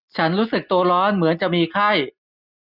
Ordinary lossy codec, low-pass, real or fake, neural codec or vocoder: none; 5.4 kHz; real; none